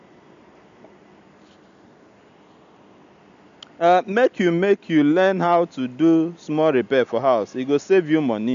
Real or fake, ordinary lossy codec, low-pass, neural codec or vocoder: real; MP3, 64 kbps; 7.2 kHz; none